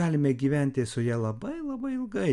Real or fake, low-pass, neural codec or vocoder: real; 10.8 kHz; none